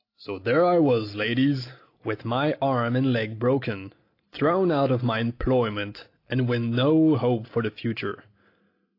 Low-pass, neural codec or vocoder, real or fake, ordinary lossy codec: 5.4 kHz; none; real; AAC, 32 kbps